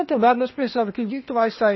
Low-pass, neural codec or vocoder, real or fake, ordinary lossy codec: 7.2 kHz; codec, 16 kHz, 0.8 kbps, ZipCodec; fake; MP3, 24 kbps